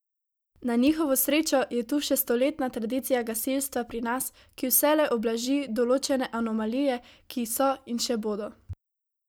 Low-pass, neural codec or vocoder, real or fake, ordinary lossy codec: none; none; real; none